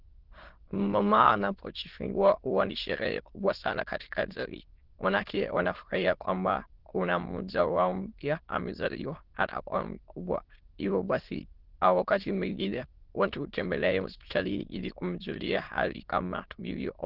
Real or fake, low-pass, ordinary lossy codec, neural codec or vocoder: fake; 5.4 kHz; Opus, 16 kbps; autoencoder, 22.05 kHz, a latent of 192 numbers a frame, VITS, trained on many speakers